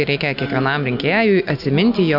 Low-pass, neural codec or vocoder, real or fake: 5.4 kHz; none; real